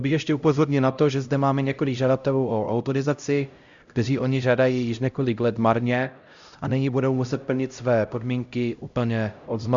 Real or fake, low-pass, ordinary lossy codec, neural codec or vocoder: fake; 7.2 kHz; Opus, 64 kbps; codec, 16 kHz, 0.5 kbps, X-Codec, HuBERT features, trained on LibriSpeech